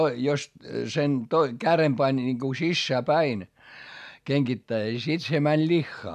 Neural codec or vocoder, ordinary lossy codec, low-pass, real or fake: none; none; 14.4 kHz; real